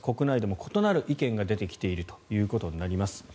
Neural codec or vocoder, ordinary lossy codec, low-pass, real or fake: none; none; none; real